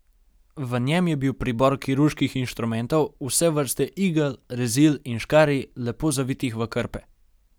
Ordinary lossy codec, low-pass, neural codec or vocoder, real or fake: none; none; none; real